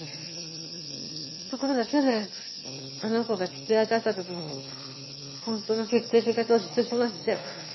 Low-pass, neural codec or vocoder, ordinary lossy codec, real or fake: 7.2 kHz; autoencoder, 22.05 kHz, a latent of 192 numbers a frame, VITS, trained on one speaker; MP3, 24 kbps; fake